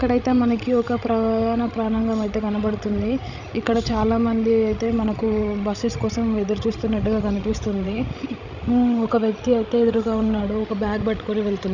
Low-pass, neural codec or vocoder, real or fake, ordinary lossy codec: 7.2 kHz; codec, 16 kHz, 16 kbps, FreqCodec, larger model; fake; none